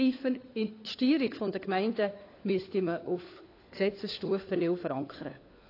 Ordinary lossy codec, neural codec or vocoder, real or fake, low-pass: AAC, 32 kbps; codec, 16 kHz, 4 kbps, FunCodec, trained on LibriTTS, 50 frames a second; fake; 5.4 kHz